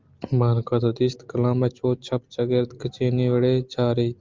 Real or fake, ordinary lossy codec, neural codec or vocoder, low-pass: real; Opus, 32 kbps; none; 7.2 kHz